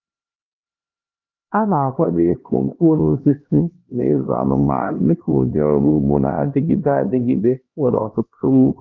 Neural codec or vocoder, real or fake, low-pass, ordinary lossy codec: codec, 16 kHz, 1 kbps, X-Codec, HuBERT features, trained on LibriSpeech; fake; 7.2 kHz; Opus, 32 kbps